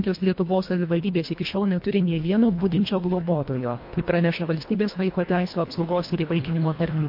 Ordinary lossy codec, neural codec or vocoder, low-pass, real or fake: MP3, 32 kbps; codec, 24 kHz, 1.5 kbps, HILCodec; 5.4 kHz; fake